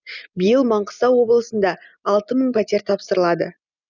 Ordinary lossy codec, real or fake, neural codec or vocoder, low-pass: none; real; none; 7.2 kHz